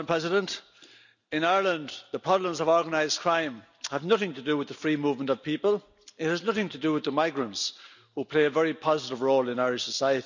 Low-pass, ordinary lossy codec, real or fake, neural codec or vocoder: 7.2 kHz; none; real; none